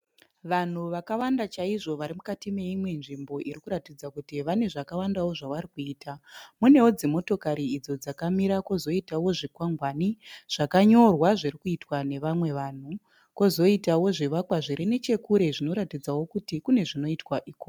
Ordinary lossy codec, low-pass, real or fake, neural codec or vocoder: MP3, 96 kbps; 19.8 kHz; real; none